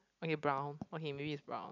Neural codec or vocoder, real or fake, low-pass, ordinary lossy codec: none; real; 7.2 kHz; none